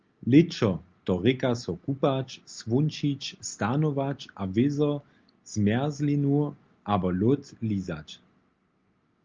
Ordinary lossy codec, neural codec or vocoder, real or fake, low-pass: Opus, 24 kbps; none; real; 7.2 kHz